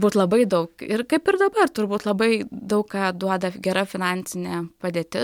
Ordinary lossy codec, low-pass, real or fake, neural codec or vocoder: MP3, 96 kbps; 19.8 kHz; real; none